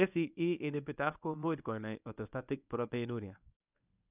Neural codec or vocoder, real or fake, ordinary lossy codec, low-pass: codec, 24 kHz, 0.9 kbps, WavTokenizer, medium speech release version 1; fake; none; 3.6 kHz